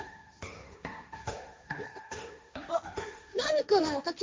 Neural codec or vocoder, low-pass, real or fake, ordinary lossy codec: codec, 16 kHz, 1.1 kbps, Voila-Tokenizer; none; fake; none